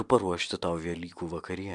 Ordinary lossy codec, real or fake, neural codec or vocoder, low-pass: MP3, 96 kbps; real; none; 10.8 kHz